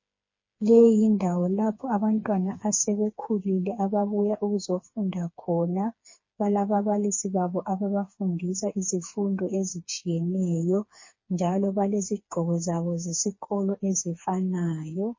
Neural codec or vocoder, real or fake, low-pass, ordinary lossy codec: codec, 16 kHz, 4 kbps, FreqCodec, smaller model; fake; 7.2 kHz; MP3, 32 kbps